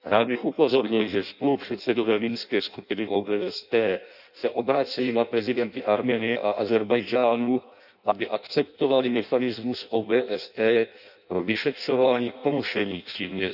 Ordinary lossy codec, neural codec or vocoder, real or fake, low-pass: none; codec, 16 kHz in and 24 kHz out, 0.6 kbps, FireRedTTS-2 codec; fake; 5.4 kHz